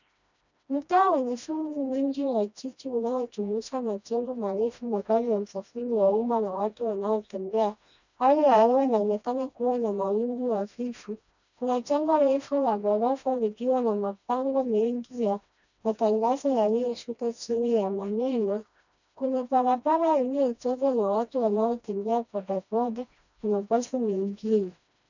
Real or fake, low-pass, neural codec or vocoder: fake; 7.2 kHz; codec, 16 kHz, 1 kbps, FreqCodec, smaller model